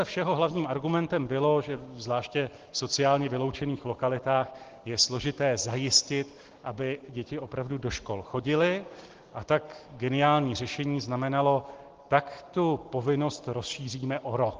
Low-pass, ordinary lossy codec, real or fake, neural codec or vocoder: 7.2 kHz; Opus, 16 kbps; real; none